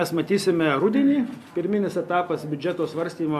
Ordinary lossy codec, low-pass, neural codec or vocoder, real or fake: MP3, 96 kbps; 14.4 kHz; none; real